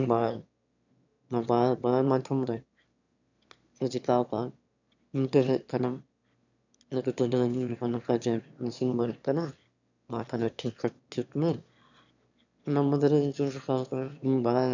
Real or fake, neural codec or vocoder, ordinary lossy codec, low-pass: fake; autoencoder, 22.05 kHz, a latent of 192 numbers a frame, VITS, trained on one speaker; none; 7.2 kHz